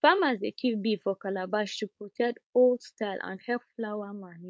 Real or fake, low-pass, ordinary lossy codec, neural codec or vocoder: fake; none; none; codec, 16 kHz, 4.8 kbps, FACodec